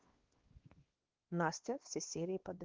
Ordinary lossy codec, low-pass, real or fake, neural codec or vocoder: Opus, 16 kbps; 7.2 kHz; fake; codec, 16 kHz, 2 kbps, X-Codec, WavLM features, trained on Multilingual LibriSpeech